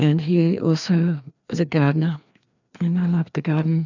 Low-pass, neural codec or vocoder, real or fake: 7.2 kHz; codec, 16 kHz, 2 kbps, FreqCodec, larger model; fake